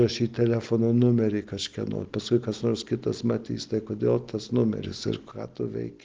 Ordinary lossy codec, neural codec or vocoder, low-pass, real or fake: Opus, 16 kbps; none; 7.2 kHz; real